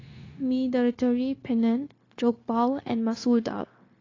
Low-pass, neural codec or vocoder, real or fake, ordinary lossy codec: 7.2 kHz; codec, 16 kHz, 0.9 kbps, LongCat-Audio-Codec; fake; AAC, 32 kbps